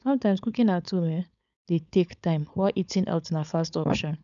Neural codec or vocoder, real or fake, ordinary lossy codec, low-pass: codec, 16 kHz, 4.8 kbps, FACodec; fake; none; 7.2 kHz